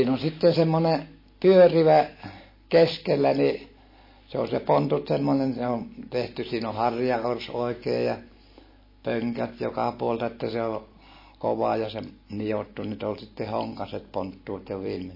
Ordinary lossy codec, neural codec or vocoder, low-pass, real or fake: MP3, 24 kbps; none; 5.4 kHz; real